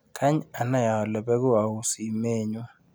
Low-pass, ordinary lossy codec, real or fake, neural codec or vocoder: none; none; real; none